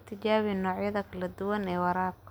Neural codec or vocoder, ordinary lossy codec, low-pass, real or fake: none; none; none; real